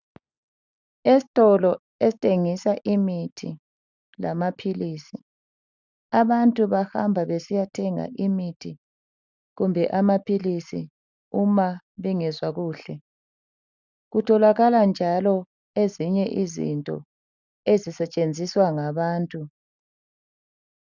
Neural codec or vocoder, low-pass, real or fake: none; 7.2 kHz; real